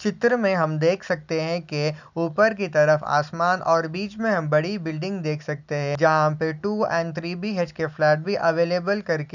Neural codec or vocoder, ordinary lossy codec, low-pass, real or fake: none; none; 7.2 kHz; real